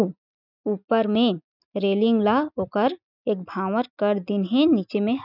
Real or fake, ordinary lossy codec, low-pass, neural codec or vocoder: real; none; 5.4 kHz; none